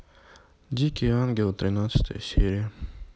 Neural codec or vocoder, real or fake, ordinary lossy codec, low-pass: none; real; none; none